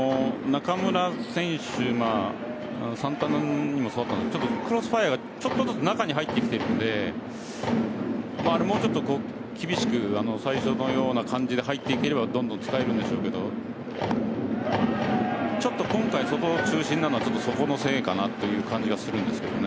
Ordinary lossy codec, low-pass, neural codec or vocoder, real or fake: none; none; none; real